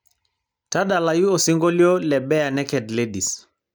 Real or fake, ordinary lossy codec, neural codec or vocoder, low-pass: real; none; none; none